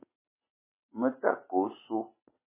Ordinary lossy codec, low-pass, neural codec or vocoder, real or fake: MP3, 16 kbps; 3.6 kHz; none; real